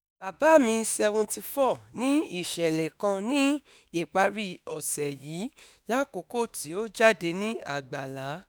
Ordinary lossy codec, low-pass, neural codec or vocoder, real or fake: none; none; autoencoder, 48 kHz, 32 numbers a frame, DAC-VAE, trained on Japanese speech; fake